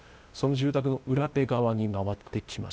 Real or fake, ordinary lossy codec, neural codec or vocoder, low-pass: fake; none; codec, 16 kHz, 0.8 kbps, ZipCodec; none